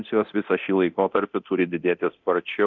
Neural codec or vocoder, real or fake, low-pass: codec, 24 kHz, 0.9 kbps, DualCodec; fake; 7.2 kHz